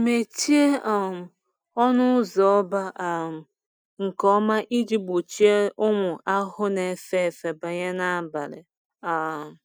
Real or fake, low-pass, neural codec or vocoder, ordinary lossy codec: real; none; none; none